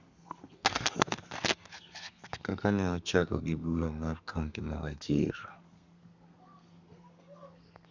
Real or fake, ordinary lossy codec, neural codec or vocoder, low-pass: fake; Opus, 64 kbps; codec, 32 kHz, 1.9 kbps, SNAC; 7.2 kHz